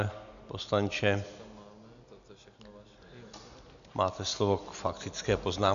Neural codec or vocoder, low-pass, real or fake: none; 7.2 kHz; real